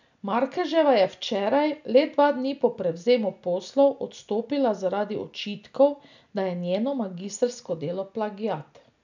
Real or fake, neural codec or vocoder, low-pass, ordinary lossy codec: real; none; 7.2 kHz; none